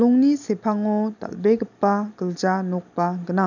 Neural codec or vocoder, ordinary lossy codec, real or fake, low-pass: none; none; real; 7.2 kHz